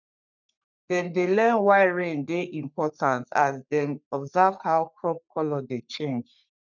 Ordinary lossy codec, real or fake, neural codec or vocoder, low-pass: none; fake; codec, 44.1 kHz, 3.4 kbps, Pupu-Codec; 7.2 kHz